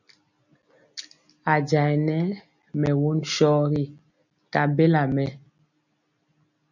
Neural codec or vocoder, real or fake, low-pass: none; real; 7.2 kHz